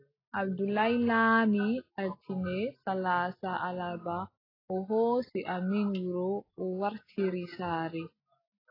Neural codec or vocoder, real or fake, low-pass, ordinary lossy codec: none; real; 5.4 kHz; AAC, 24 kbps